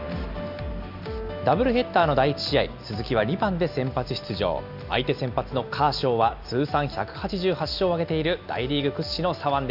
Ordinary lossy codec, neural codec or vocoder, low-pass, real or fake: AAC, 48 kbps; none; 5.4 kHz; real